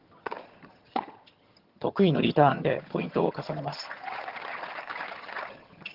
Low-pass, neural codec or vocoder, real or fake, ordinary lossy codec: 5.4 kHz; vocoder, 22.05 kHz, 80 mel bands, HiFi-GAN; fake; Opus, 16 kbps